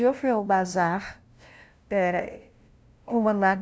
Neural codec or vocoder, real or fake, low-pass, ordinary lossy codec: codec, 16 kHz, 0.5 kbps, FunCodec, trained on LibriTTS, 25 frames a second; fake; none; none